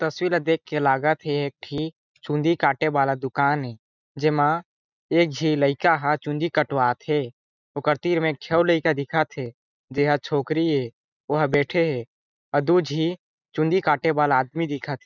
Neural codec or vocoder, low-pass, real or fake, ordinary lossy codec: none; 7.2 kHz; real; none